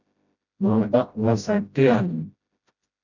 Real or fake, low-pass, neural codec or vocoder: fake; 7.2 kHz; codec, 16 kHz, 0.5 kbps, FreqCodec, smaller model